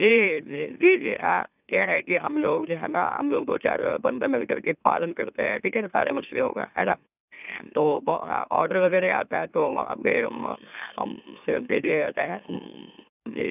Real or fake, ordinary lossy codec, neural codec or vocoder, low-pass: fake; none; autoencoder, 44.1 kHz, a latent of 192 numbers a frame, MeloTTS; 3.6 kHz